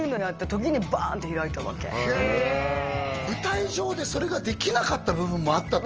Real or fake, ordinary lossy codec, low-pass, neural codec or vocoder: real; Opus, 24 kbps; 7.2 kHz; none